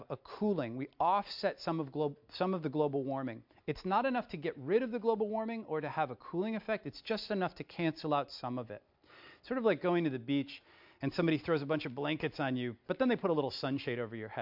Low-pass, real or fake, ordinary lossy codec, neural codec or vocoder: 5.4 kHz; real; MP3, 48 kbps; none